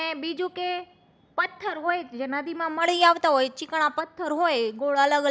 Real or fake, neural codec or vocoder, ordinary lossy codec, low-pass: real; none; none; none